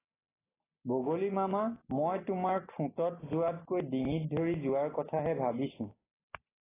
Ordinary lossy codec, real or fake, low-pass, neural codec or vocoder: AAC, 16 kbps; real; 3.6 kHz; none